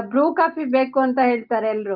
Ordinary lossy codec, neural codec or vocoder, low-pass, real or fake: Opus, 24 kbps; none; 5.4 kHz; real